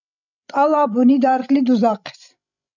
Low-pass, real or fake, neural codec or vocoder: 7.2 kHz; fake; codec, 16 kHz, 16 kbps, FreqCodec, larger model